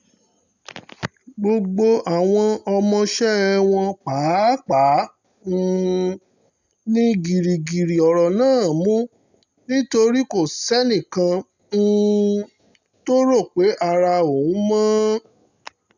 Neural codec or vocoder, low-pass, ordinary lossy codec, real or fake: none; 7.2 kHz; none; real